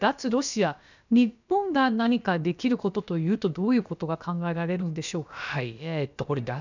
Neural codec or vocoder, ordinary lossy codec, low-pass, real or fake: codec, 16 kHz, about 1 kbps, DyCAST, with the encoder's durations; none; 7.2 kHz; fake